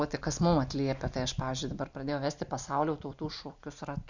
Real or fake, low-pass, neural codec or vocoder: real; 7.2 kHz; none